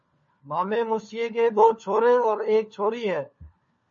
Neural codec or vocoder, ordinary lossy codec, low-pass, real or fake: codec, 16 kHz, 4 kbps, FunCodec, trained on LibriTTS, 50 frames a second; MP3, 32 kbps; 7.2 kHz; fake